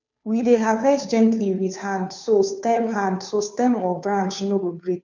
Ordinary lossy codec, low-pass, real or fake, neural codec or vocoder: none; 7.2 kHz; fake; codec, 16 kHz, 2 kbps, FunCodec, trained on Chinese and English, 25 frames a second